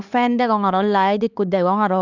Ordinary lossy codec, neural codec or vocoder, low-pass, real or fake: none; codec, 16 kHz, 2 kbps, X-Codec, HuBERT features, trained on LibriSpeech; 7.2 kHz; fake